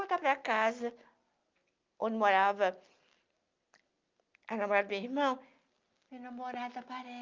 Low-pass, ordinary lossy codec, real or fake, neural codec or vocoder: 7.2 kHz; Opus, 24 kbps; real; none